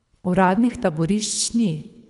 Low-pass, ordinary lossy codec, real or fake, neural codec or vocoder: 10.8 kHz; none; fake; codec, 24 kHz, 3 kbps, HILCodec